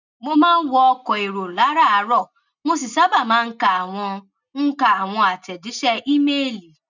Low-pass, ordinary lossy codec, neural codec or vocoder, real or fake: 7.2 kHz; none; none; real